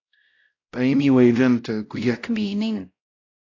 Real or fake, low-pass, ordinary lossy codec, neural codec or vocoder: fake; 7.2 kHz; AAC, 48 kbps; codec, 16 kHz, 0.5 kbps, X-Codec, WavLM features, trained on Multilingual LibriSpeech